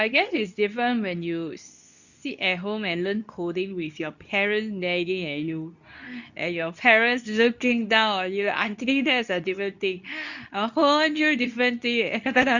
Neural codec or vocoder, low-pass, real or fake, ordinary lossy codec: codec, 24 kHz, 0.9 kbps, WavTokenizer, medium speech release version 2; 7.2 kHz; fake; none